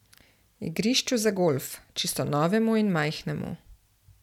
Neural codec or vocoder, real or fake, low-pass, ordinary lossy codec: none; real; 19.8 kHz; none